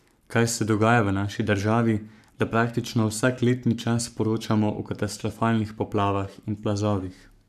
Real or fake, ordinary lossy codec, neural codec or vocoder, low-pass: fake; none; codec, 44.1 kHz, 7.8 kbps, Pupu-Codec; 14.4 kHz